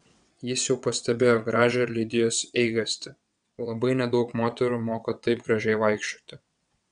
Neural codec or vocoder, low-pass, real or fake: vocoder, 22.05 kHz, 80 mel bands, WaveNeXt; 9.9 kHz; fake